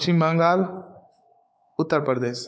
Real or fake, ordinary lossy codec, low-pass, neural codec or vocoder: fake; none; none; codec, 16 kHz, 4 kbps, X-Codec, WavLM features, trained on Multilingual LibriSpeech